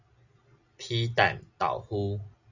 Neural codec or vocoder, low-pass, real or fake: none; 7.2 kHz; real